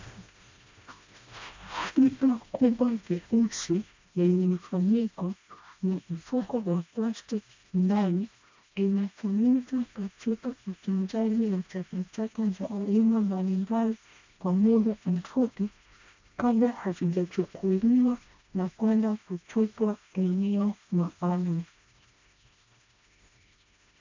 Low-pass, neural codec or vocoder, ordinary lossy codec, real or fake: 7.2 kHz; codec, 16 kHz, 1 kbps, FreqCodec, smaller model; MP3, 64 kbps; fake